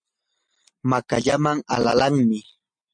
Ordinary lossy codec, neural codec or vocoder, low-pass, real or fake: MP3, 48 kbps; none; 9.9 kHz; real